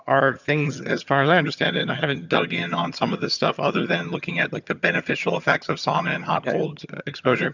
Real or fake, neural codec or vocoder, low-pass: fake; vocoder, 22.05 kHz, 80 mel bands, HiFi-GAN; 7.2 kHz